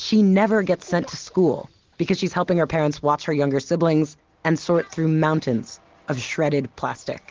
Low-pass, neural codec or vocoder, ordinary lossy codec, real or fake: 7.2 kHz; none; Opus, 16 kbps; real